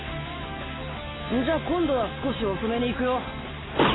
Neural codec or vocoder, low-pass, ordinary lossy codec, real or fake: none; 7.2 kHz; AAC, 16 kbps; real